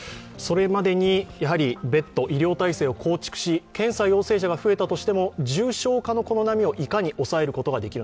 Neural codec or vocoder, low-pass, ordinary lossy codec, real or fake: none; none; none; real